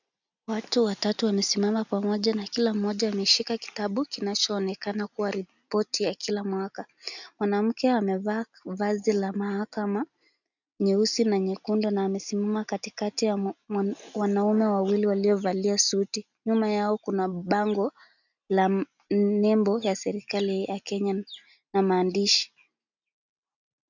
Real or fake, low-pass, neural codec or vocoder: real; 7.2 kHz; none